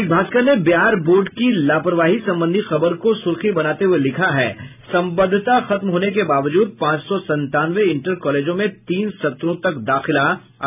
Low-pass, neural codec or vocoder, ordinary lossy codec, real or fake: 3.6 kHz; none; none; real